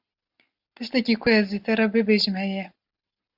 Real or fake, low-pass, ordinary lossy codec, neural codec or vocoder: real; 5.4 kHz; AAC, 48 kbps; none